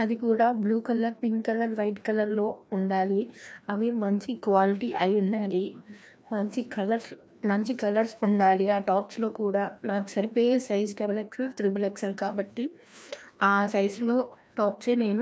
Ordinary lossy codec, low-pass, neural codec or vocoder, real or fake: none; none; codec, 16 kHz, 1 kbps, FreqCodec, larger model; fake